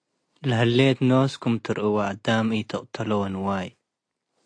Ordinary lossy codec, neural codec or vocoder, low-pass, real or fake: AAC, 48 kbps; none; 9.9 kHz; real